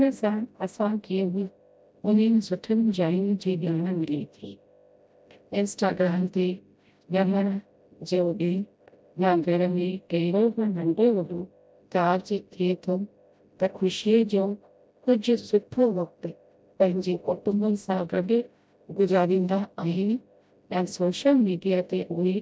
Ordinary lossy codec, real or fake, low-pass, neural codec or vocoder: none; fake; none; codec, 16 kHz, 0.5 kbps, FreqCodec, smaller model